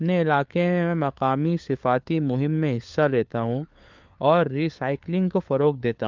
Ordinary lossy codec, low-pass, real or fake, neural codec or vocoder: Opus, 24 kbps; 7.2 kHz; fake; codec, 16 kHz, 4 kbps, FunCodec, trained on LibriTTS, 50 frames a second